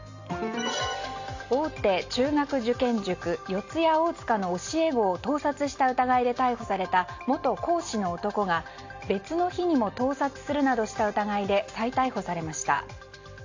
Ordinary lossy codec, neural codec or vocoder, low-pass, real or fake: AAC, 48 kbps; none; 7.2 kHz; real